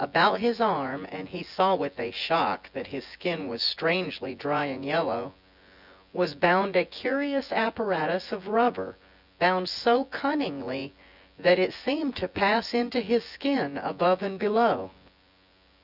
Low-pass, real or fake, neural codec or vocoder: 5.4 kHz; fake; vocoder, 24 kHz, 100 mel bands, Vocos